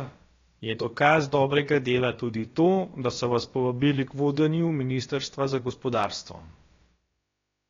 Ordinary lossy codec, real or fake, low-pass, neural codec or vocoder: AAC, 32 kbps; fake; 7.2 kHz; codec, 16 kHz, about 1 kbps, DyCAST, with the encoder's durations